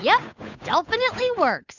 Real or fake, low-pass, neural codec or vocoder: fake; 7.2 kHz; codec, 16 kHz, 8 kbps, FunCodec, trained on Chinese and English, 25 frames a second